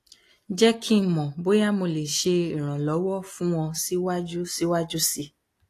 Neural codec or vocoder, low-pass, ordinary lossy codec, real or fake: none; 14.4 kHz; AAC, 48 kbps; real